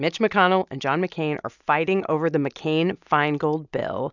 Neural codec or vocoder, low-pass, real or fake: none; 7.2 kHz; real